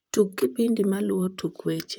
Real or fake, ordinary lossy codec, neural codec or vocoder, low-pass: fake; none; vocoder, 44.1 kHz, 128 mel bands, Pupu-Vocoder; 19.8 kHz